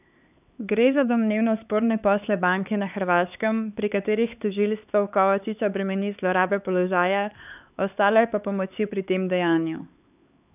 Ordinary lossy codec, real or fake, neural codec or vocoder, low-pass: none; fake; codec, 16 kHz, 4 kbps, X-Codec, HuBERT features, trained on LibriSpeech; 3.6 kHz